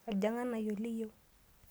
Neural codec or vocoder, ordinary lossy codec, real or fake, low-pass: none; none; real; none